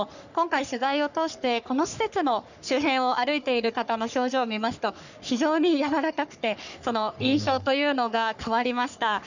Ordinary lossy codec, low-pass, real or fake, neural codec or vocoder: none; 7.2 kHz; fake; codec, 44.1 kHz, 3.4 kbps, Pupu-Codec